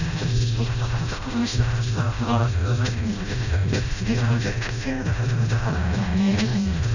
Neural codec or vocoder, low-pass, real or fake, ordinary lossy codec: codec, 16 kHz, 0.5 kbps, FreqCodec, smaller model; 7.2 kHz; fake; none